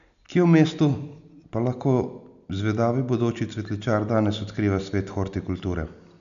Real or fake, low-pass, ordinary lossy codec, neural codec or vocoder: real; 7.2 kHz; none; none